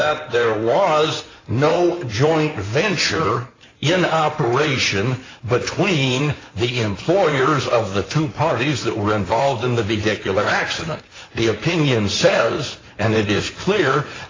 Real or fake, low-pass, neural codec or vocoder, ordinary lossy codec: fake; 7.2 kHz; codec, 16 kHz in and 24 kHz out, 2.2 kbps, FireRedTTS-2 codec; AAC, 32 kbps